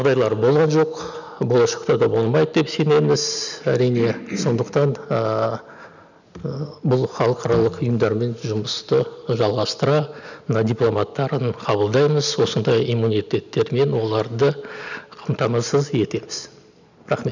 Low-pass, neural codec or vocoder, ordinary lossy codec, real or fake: 7.2 kHz; none; none; real